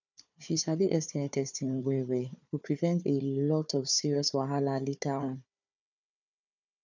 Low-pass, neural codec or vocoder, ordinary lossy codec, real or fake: 7.2 kHz; codec, 16 kHz, 4 kbps, FunCodec, trained on Chinese and English, 50 frames a second; none; fake